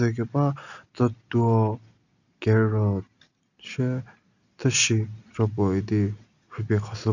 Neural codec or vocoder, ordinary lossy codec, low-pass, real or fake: none; none; 7.2 kHz; real